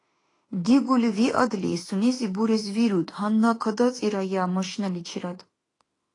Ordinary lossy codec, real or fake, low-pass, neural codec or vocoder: AAC, 32 kbps; fake; 10.8 kHz; autoencoder, 48 kHz, 32 numbers a frame, DAC-VAE, trained on Japanese speech